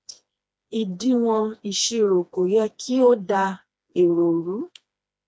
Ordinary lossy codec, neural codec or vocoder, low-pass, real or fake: none; codec, 16 kHz, 2 kbps, FreqCodec, smaller model; none; fake